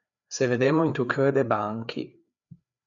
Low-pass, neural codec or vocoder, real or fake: 7.2 kHz; codec, 16 kHz, 4 kbps, FreqCodec, larger model; fake